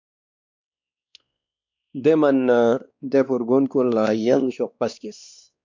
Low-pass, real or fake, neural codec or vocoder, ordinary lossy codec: 7.2 kHz; fake; codec, 16 kHz, 2 kbps, X-Codec, WavLM features, trained on Multilingual LibriSpeech; MP3, 64 kbps